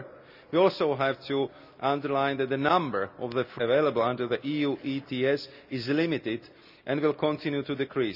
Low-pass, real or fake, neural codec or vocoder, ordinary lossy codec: 5.4 kHz; real; none; none